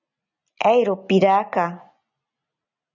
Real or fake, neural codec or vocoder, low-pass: real; none; 7.2 kHz